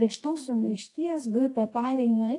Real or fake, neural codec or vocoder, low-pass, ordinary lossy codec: fake; codec, 24 kHz, 0.9 kbps, WavTokenizer, medium music audio release; 10.8 kHz; AAC, 48 kbps